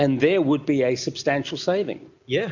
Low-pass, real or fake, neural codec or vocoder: 7.2 kHz; real; none